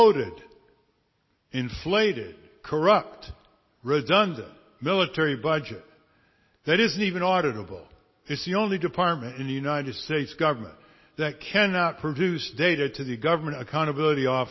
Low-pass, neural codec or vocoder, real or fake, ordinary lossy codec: 7.2 kHz; none; real; MP3, 24 kbps